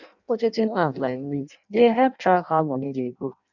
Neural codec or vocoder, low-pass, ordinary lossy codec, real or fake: codec, 16 kHz in and 24 kHz out, 0.6 kbps, FireRedTTS-2 codec; 7.2 kHz; none; fake